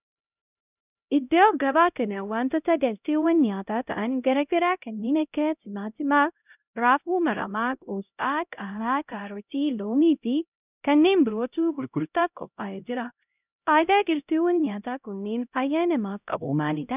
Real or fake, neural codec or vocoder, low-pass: fake; codec, 16 kHz, 0.5 kbps, X-Codec, HuBERT features, trained on LibriSpeech; 3.6 kHz